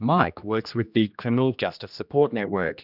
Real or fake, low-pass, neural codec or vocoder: fake; 5.4 kHz; codec, 16 kHz, 1 kbps, X-Codec, HuBERT features, trained on general audio